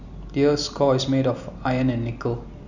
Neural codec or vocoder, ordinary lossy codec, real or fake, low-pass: none; none; real; 7.2 kHz